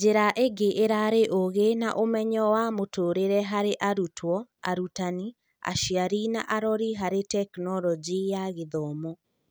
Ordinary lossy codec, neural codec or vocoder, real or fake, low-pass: none; none; real; none